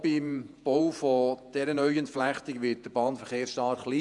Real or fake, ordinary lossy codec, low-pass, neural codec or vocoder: fake; none; 10.8 kHz; vocoder, 48 kHz, 128 mel bands, Vocos